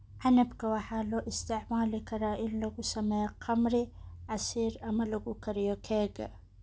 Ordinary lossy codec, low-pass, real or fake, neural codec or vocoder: none; none; real; none